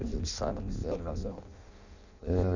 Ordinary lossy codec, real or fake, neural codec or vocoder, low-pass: none; fake; codec, 16 kHz in and 24 kHz out, 0.6 kbps, FireRedTTS-2 codec; 7.2 kHz